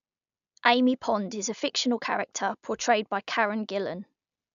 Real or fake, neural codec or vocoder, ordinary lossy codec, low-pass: real; none; AAC, 96 kbps; 7.2 kHz